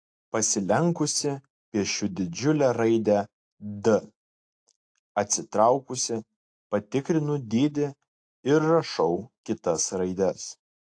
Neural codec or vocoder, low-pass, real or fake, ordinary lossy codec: none; 9.9 kHz; real; AAC, 48 kbps